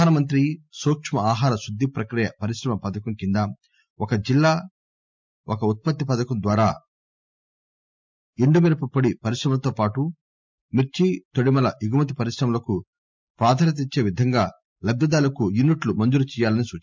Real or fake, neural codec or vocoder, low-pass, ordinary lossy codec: real; none; 7.2 kHz; MP3, 64 kbps